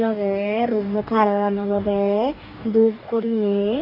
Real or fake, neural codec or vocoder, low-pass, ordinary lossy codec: fake; codec, 44.1 kHz, 2.6 kbps, DAC; 5.4 kHz; none